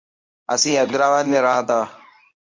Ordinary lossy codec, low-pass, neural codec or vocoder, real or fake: MP3, 48 kbps; 7.2 kHz; codec, 24 kHz, 0.9 kbps, WavTokenizer, medium speech release version 1; fake